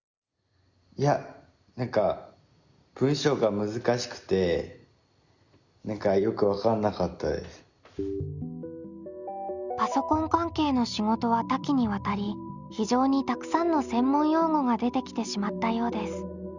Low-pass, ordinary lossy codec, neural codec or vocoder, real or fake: 7.2 kHz; Opus, 64 kbps; none; real